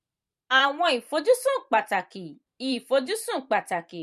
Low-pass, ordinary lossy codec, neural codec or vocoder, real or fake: 14.4 kHz; MP3, 64 kbps; vocoder, 44.1 kHz, 128 mel bands every 512 samples, BigVGAN v2; fake